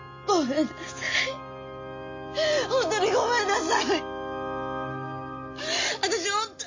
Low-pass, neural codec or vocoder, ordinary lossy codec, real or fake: 7.2 kHz; none; MP3, 32 kbps; real